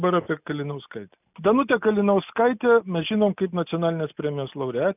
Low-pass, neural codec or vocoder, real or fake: 3.6 kHz; none; real